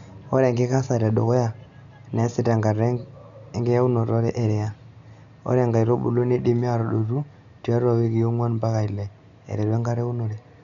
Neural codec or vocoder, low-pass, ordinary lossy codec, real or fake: none; 7.2 kHz; none; real